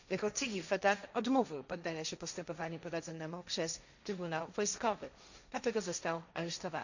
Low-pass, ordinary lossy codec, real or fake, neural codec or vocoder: 7.2 kHz; none; fake; codec, 16 kHz, 1.1 kbps, Voila-Tokenizer